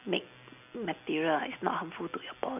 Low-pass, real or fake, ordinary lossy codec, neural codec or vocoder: 3.6 kHz; real; none; none